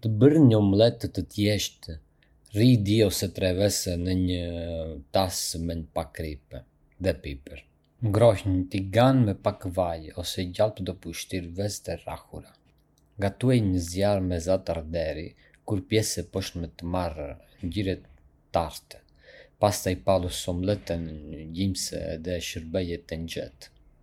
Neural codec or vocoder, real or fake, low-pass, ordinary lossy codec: none; real; 19.8 kHz; MP3, 96 kbps